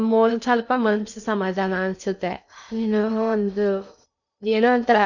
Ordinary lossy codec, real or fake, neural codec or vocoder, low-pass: none; fake; codec, 16 kHz in and 24 kHz out, 0.6 kbps, FocalCodec, streaming, 2048 codes; 7.2 kHz